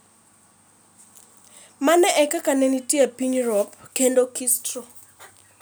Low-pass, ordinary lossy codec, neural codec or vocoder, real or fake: none; none; none; real